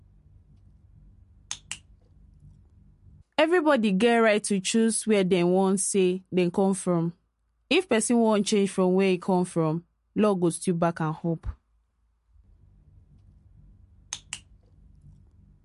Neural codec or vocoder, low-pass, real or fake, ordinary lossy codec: none; 14.4 kHz; real; MP3, 48 kbps